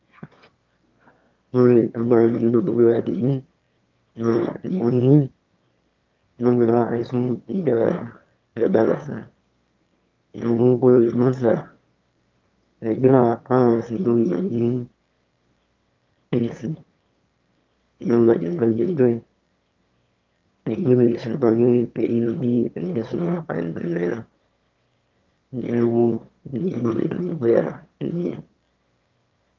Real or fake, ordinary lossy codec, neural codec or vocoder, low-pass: fake; Opus, 24 kbps; autoencoder, 22.05 kHz, a latent of 192 numbers a frame, VITS, trained on one speaker; 7.2 kHz